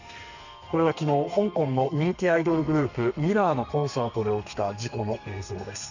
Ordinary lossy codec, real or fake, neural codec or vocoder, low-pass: none; fake; codec, 32 kHz, 1.9 kbps, SNAC; 7.2 kHz